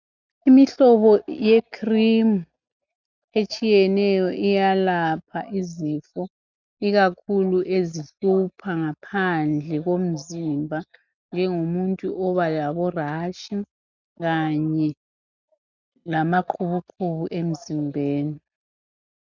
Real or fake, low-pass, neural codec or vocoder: real; 7.2 kHz; none